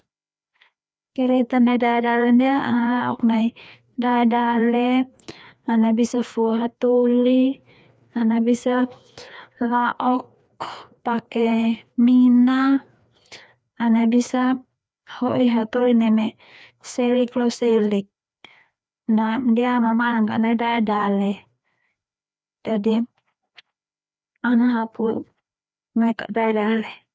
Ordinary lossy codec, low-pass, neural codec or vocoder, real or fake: none; none; codec, 16 kHz, 2 kbps, FreqCodec, larger model; fake